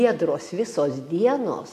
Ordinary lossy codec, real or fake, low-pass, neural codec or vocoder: AAC, 64 kbps; real; 14.4 kHz; none